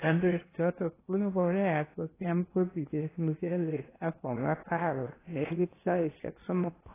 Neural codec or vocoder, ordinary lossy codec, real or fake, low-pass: codec, 16 kHz in and 24 kHz out, 0.8 kbps, FocalCodec, streaming, 65536 codes; AAC, 16 kbps; fake; 3.6 kHz